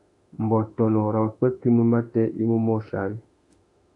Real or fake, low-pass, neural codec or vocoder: fake; 10.8 kHz; autoencoder, 48 kHz, 32 numbers a frame, DAC-VAE, trained on Japanese speech